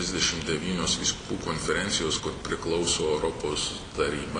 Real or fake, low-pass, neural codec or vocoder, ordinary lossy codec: real; 9.9 kHz; none; AAC, 32 kbps